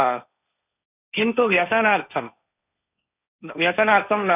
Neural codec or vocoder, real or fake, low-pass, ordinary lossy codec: codec, 16 kHz, 1.1 kbps, Voila-Tokenizer; fake; 3.6 kHz; none